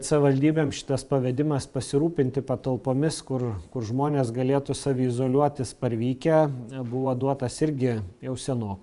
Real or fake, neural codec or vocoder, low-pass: fake; vocoder, 24 kHz, 100 mel bands, Vocos; 10.8 kHz